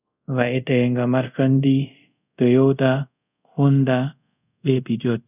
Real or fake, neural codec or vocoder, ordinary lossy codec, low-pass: fake; codec, 24 kHz, 0.5 kbps, DualCodec; none; 3.6 kHz